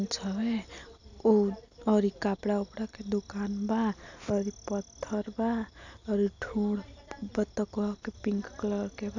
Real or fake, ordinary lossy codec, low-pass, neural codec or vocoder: real; none; 7.2 kHz; none